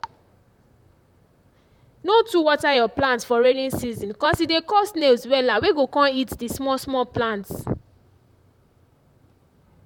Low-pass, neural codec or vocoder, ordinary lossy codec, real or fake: 19.8 kHz; vocoder, 44.1 kHz, 128 mel bands, Pupu-Vocoder; none; fake